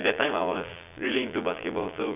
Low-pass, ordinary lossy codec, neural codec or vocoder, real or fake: 3.6 kHz; none; vocoder, 22.05 kHz, 80 mel bands, Vocos; fake